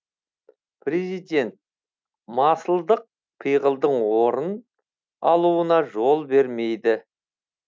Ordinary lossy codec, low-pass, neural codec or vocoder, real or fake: none; none; none; real